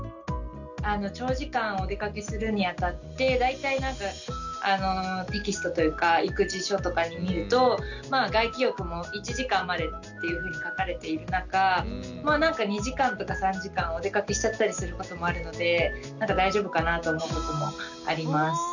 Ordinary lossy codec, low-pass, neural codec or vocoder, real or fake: none; 7.2 kHz; none; real